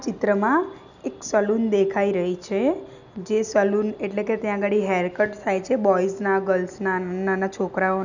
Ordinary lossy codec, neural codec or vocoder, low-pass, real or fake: none; none; 7.2 kHz; real